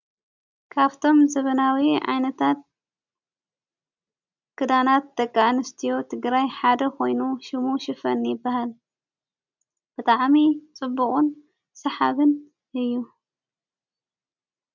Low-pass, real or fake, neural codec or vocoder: 7.2 kHz; real; none